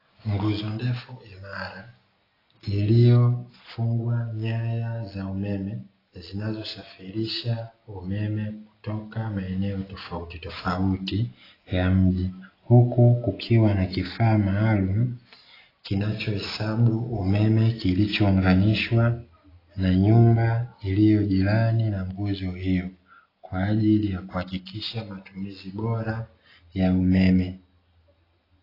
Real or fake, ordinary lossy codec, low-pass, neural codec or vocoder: real; AAC, 24 kbps; 5.4 kHz; none